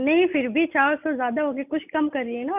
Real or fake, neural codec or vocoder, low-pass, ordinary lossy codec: real; none; 3.6 kHz; none